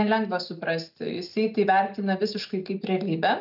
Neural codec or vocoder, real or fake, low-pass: none; real; 5.4 kHz